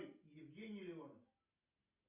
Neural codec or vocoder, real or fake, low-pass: none; real; 3.6 kHz